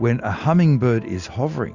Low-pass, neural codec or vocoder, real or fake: 7.2 kHz; none; real